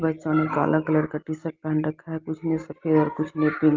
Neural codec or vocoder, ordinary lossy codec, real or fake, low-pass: none; Opus, 32 kbps; real; 7.2 kHz